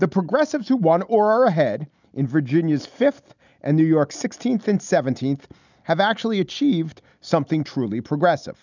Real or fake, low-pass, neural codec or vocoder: real; 7.2 kHz; none